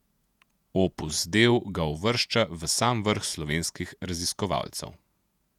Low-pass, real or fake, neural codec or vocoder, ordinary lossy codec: 19.8 kHz; fake; vocoder, 48 kHz, 128 mel bands, Vocos; none